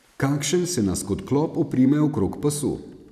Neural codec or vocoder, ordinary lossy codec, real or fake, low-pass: none; none; real; 14.4 kHz